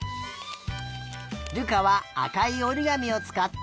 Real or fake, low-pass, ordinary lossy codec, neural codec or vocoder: real; none; none; none